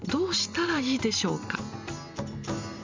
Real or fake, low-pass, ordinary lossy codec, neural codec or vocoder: fake; 7.2 kHz; MP3, 64 kbps; vocoder, 44.1 kHz, 128 mel bands every 512 samples, BigVGAN v2